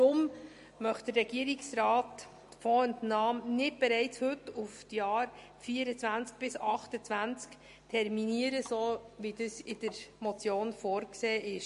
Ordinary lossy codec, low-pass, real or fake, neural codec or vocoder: MP3, 48 kbps; 14.4 kHz; real; none